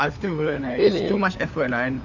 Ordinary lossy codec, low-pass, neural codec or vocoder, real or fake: none; 7.2 kHz; codec, 16 kHz, 4 kbps, FunCodec, trained on LibriTTS, 50 frames a second; fake